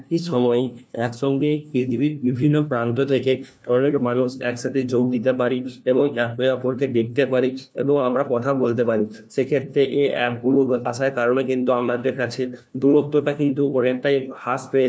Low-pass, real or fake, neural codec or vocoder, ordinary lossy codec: none; fake; codec, 16 kHz, 1 kbps, FunCodec, trained on LibriTTS, 50 frames a second; none